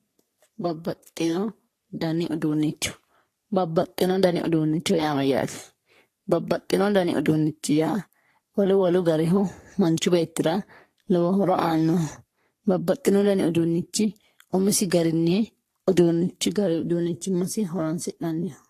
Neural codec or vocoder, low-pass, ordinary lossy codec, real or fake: codec, 44.1 kHz, 3.4 kbps, Pupu-Codec; 14.4 kHz; AAC, 48 kbps; fake